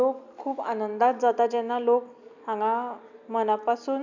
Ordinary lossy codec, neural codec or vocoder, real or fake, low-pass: none; none; real; 7.2 kHz